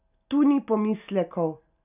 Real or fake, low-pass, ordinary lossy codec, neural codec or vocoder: real; 3.6 kHz; none; none